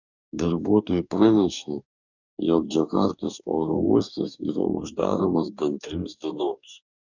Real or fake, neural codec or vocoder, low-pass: fake; codec, 44.1 kHz, 2.6 kbps, DAC; 7.2 kHz